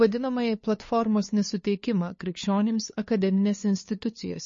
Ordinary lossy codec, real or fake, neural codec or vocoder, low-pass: MP3, 32 kbps; fake; codec, 16 kHz, 2 kbps, FunCodec, trained on LibriTTS, 25 frames a second; 7.2 kHz